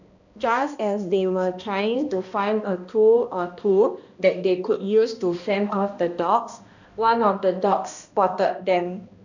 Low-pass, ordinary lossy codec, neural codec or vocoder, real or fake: 7.2 kHz; none; codec, 16 kHz, 1 kbps, X-Codec, HuBERT features, trained on balanced general audio; fake